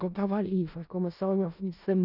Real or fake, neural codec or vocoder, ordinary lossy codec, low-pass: fake; codec, 16 kHz in and 24 kHz out, 0.4 kbps, LongCat-Audio-Codec, four codebook decoder; none; 5.4 kHz